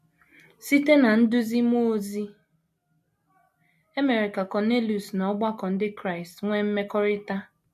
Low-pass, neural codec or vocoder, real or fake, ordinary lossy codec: 14.4 kHz; none; real; MP3, 64 kbps